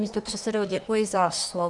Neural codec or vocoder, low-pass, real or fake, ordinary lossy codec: codec, 24 kHz, 1 kbps, SNAC; 10.8 kHz; fake; Opus, 64 kbps